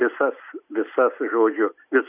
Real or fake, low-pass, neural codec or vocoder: real; 3.6 kHz; none